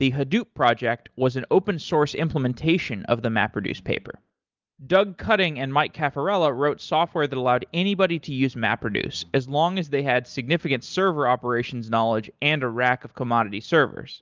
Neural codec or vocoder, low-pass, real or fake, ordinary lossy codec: none; 7.2 kHz; real; Opus, 24 kbps